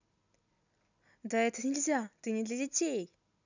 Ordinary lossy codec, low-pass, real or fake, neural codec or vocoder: none; 7.2 kHz; real; none